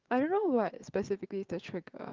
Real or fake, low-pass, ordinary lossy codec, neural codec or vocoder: fake; 7.2 kHz; Opus, 32 kbps; vocoder, 22.05 kHz, 80 mel bands, WaveNeXt